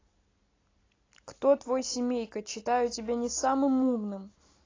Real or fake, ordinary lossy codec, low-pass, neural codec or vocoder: real; AAC, 32 kbps; 7.2 kHz; none